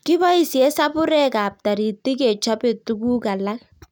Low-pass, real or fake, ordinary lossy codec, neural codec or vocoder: 19.8 kHz; real; none; none